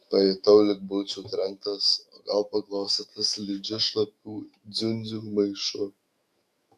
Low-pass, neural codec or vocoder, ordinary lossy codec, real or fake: 14.4 kHz; autoencoder, 48 kHz, 128 numbers a frame, DAC-VAE, trained on Japanese speech; Opus, 64 kbps; fake